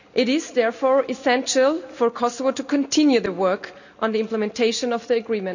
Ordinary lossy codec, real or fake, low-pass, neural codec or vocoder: none; real; 7.2 kHz; none